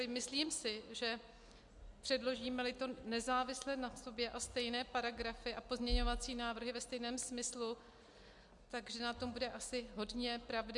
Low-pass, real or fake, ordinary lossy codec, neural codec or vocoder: 10.8 kHz; real; MP3, 64 kbps; none